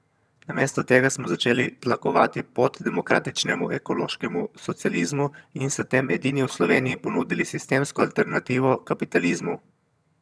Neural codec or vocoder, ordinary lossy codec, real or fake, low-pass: vocoder, 22.05 kHz, 80 mel bands, HiFi-GAN; none; fake; none